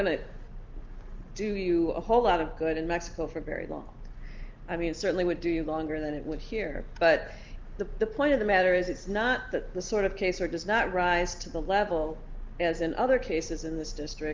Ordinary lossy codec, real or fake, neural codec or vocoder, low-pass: Opus, 32 kbps; real; none; 7.2 kHz